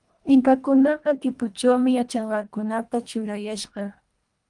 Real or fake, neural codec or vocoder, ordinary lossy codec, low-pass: fake; codec, 24 kHz, 1.5 kbps, HILCodec; Opus, 32 kbps; 10.8 kHz